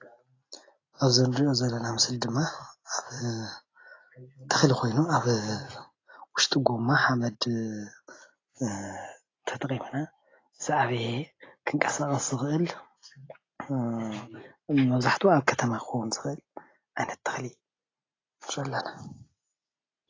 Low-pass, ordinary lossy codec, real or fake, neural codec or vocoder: 7.2 kHz; AAC, 32 kbps; real; none